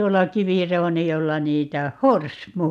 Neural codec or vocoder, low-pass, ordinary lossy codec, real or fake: none; 14.4 kHz; none; real